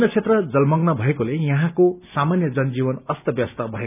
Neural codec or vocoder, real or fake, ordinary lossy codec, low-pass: none; real; none; 3.6 kHz